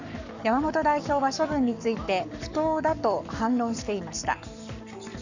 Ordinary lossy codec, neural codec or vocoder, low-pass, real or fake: none; codec, 44.1 kHz, 7.8 kbps, Pupu-Codec; 7.2 kHz; fake